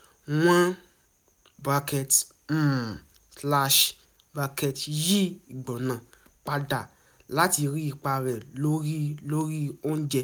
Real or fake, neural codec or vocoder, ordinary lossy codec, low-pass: real; none; none; none